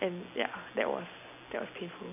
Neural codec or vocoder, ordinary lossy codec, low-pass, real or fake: none; none; 3.6 kHz; real